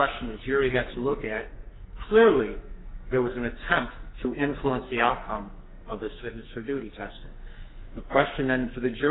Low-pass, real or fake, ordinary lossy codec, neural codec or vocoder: 7.2 kHz; fake; AAC, 16 kbps; codec, 44.1 kHz, 2.6 kbps, SNAC